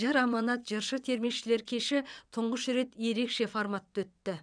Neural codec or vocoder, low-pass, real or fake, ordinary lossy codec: vocoder, 22.05 kHz, 80 mel bands, WaveNeXt; 9.9 kHz; fake; none